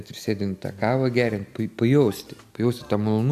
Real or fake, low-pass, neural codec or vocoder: real; 14.4 kHz; none